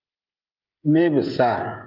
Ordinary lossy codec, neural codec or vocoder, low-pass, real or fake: Opus, 32 kbps; codec, 16 kHz, 16 kbps, FreqCodec, smaller model; 5.4 kHz; fake